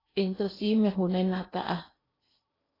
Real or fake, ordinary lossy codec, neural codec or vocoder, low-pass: fake; AAC, 24 kbps; codec, 16 kHz in and 24 kHz out, 0.8 kbps, FocalCodec, streaming, 65536 codes; 5.4 kHz